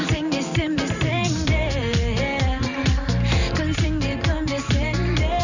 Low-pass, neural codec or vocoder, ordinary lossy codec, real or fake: 7.2 kHz; vocoder, 44.1 kHz, 128 mel bands every 512 samples, BigVGAN v2; none; fake